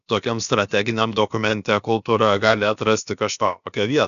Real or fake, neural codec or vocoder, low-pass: fake; codec, 16 kHz, about 1 kbps, DyCAST, with the encoder's durations; 7.2 kHz